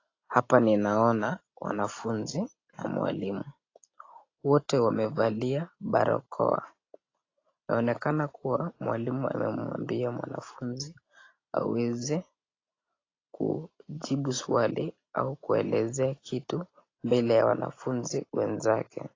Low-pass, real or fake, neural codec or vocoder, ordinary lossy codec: 7.2 kHz; real; none; AAC, 32 kbps